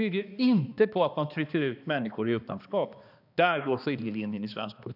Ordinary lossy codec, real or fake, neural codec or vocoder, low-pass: none; fake; codec, 16 kHz, 2 kbps, X-Codec, HuBERT features, trained on balanced general audio; 5.4 kHz